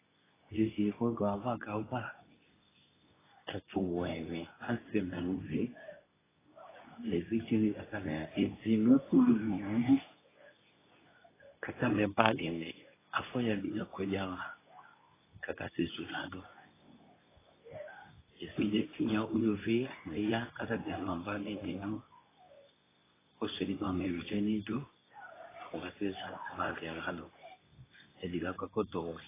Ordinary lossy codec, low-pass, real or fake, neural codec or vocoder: AAC, 16 kbps; 3.6 kHz; fake; codec, 24 kHz, 0.9 kbps, WavTokenizer, medium speech release version 2